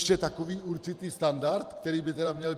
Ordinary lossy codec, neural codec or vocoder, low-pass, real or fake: Opus, 32 kbps; vocoder, 44.1 kHz, 128 mel bands every 512 samples, BigVGAN v2; 14.4 kHz; fake